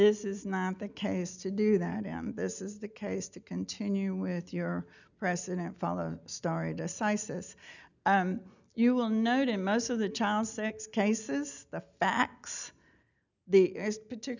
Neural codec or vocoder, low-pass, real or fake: none; 7.2 kHz; real